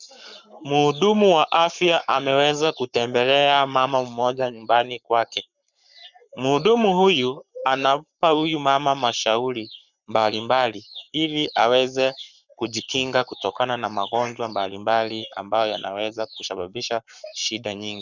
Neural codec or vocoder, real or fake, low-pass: codec, 44.1 kHz, 7.8 kbps, Pupu-Codec; fake; 7.2 kHz